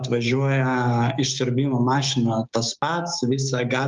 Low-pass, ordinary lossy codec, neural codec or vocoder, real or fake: 7.2 kHz; Opus, 24 kbps; codec, 16 kHz, 6 kbps, DAC; fake